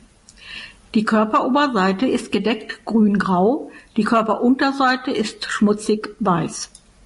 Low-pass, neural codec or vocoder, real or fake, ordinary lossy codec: 14.4 kHz; none; real; MP3, 48 kbps